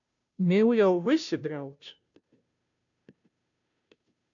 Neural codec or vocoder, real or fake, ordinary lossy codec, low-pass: codec, 16 kHz, 0.5 kbps, FunCodec, trained on Chinese and English, 25 frames a second; fake; AAC, 64 kbps; 7.2 kHz